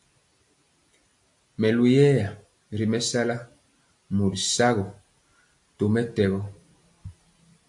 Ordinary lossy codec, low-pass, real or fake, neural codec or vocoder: AAC, 64 kbps; 10.8 kHz; real; none